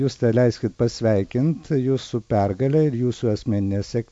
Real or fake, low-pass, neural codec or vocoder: real; 10.8 kHz; none